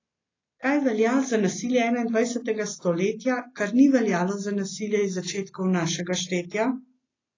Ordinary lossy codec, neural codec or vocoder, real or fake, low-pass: AAC, 32 kbps; none; real; 7.2 kHz